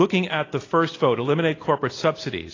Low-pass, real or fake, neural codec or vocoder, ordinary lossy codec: 7.2 kHz; real; none; AAC, 32 kbps